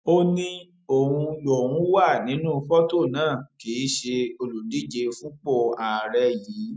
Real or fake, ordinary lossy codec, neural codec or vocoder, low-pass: real; none; none; none